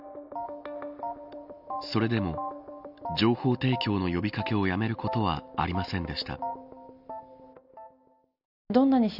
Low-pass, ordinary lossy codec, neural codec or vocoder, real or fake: 5.4 kHz; none; none; real